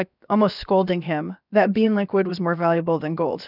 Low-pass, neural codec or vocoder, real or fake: 5.4 kHz; codec, 16 kHz, about 1 kbps, DyCAST, with the encoder's durations; fake